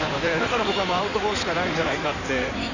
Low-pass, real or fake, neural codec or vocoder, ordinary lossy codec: 7.2 kHz; fake; codec, 16 kHz in and 24 kHz out, 2.2 kbps, FireRedTTS-2 codec; none